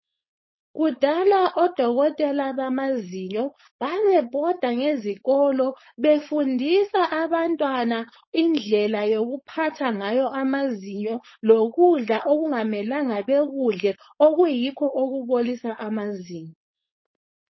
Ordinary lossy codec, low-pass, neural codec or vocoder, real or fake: MP3, 24 kbps; 7.2 kHz; codec, 16 kHz, 4.8 kbps, FACodec; fake